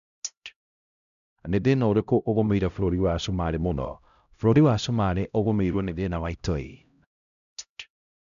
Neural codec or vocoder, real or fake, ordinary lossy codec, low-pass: codec, 16 kHz, 0.5 kbps, X-Codec, HuBERT features, trained on LibriSpeech; fake; none; 7.2 kHz